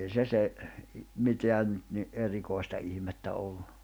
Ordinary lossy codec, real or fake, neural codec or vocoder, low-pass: none; real; none; none